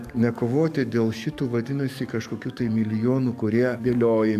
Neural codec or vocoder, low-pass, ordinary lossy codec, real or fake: codec, 44.1 kHz, 7.8 kbps, DAC; 14.4 kHz; AAC, 96 kbps; fake